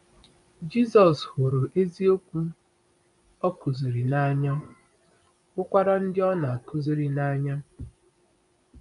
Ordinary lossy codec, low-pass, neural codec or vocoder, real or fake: none; 10.8 kHz; vocoder, 24 kHz, 100 mel bands, Vocos; fake